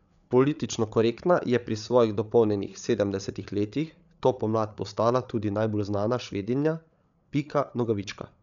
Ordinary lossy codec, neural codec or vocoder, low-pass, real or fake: none; codec, 16 kHz, 8 kbps, FreqCodec, larger model; 7.2 kHz; fake